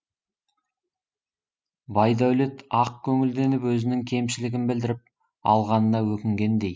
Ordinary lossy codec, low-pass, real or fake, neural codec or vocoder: none; none; real; none